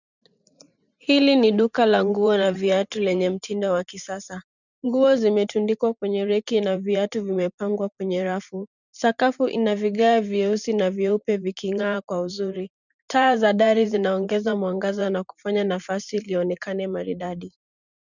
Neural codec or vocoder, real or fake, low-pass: vocoder, 44.1 kHz, 128 mel bands every 512 samples, BigVGAN v2; fake; 7.2 kHz